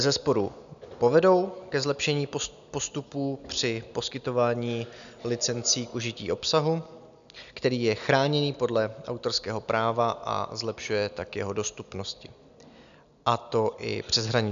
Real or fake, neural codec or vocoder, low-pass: real; none; 7.2 kHz